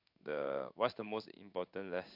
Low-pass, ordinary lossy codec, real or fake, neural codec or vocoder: 5.4 kHz; MP3, 48 kbps; fake; codec, 16 kHz in and 24 kHz out, 1 kbps, XY-Tokenizer